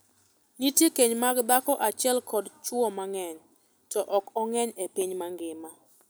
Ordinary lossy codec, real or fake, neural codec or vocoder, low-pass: none; real; none; none